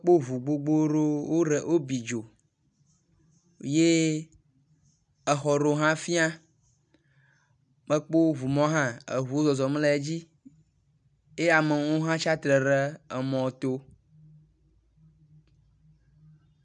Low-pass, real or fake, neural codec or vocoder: 9.9 kHz; real; none